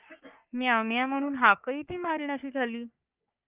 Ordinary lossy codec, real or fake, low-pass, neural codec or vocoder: Opus, 24 kbps; fake; 3.6 kHz; codec, 44.1 kHz, 3.4 kbps, Pupu-Codec